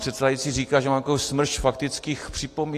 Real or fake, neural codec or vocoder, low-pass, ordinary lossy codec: real; none; 14.4 kHz; AAC, 48 kbps